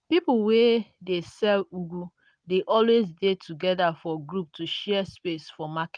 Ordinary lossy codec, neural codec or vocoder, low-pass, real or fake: none; none; 9.9 kHz; real